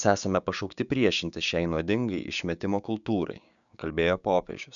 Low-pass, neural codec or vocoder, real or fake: 7.2 kHz; codec, 16 kHz, 6 kbps, DAC; fake